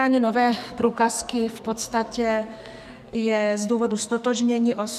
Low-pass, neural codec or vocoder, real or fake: 14.4 kHz; codec, 44.1 kHz, 2.6 kbps, SNAC; fake